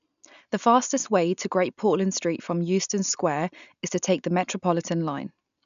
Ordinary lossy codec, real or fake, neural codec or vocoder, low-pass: none; real; none; 7.2 kHz